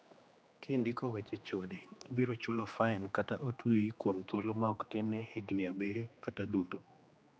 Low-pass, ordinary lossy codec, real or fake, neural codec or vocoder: none; none; fake; codec, 16 kHz, 2 kbps, X-Codec, HuBERT features, trained on general audio